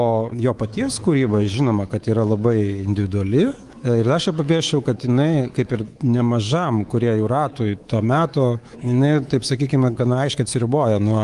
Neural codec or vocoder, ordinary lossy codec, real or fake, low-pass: codec, 24 kHz, 3.1 kbps, DualCodec; Opus, 24 kbps; fake; 10.8 kHz